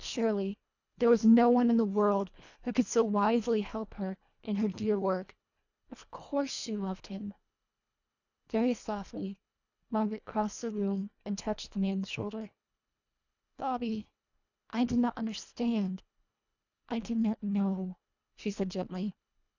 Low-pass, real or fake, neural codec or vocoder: 7.2 kHz; fake; codec, 24 kHz, 1.5 kbps, HILCodec